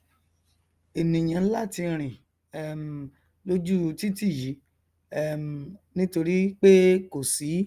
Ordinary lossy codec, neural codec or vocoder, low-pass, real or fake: Opus, 32 kbps; none; 14.4 kHz; real